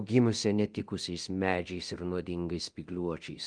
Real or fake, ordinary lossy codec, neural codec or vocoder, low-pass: fake; Opus, 24 kbps; codec, 24 kHz, 0.9 kbps, DualCodec; 9.9 kHz